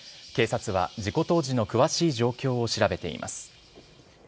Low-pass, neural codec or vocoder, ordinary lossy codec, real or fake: none; none; none; real